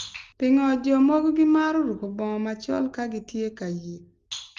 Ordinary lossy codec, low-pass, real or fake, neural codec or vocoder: Opus, 32 kbps; 7.2 kHz; real; none